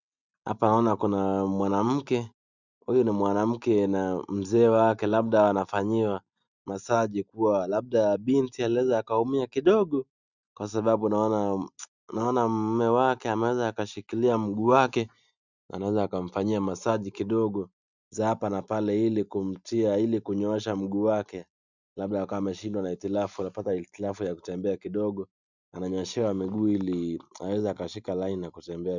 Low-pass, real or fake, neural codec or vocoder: 7.2 kHz; real; none